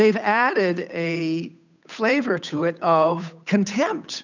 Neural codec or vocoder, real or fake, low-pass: codec, 16 kHz, 8 kbps, FunCodec, trained on Chinese and English, 25 frames a second; fake; 7.2 kHz